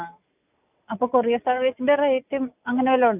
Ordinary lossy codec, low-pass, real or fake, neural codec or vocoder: none; 3.6 kHz; real; none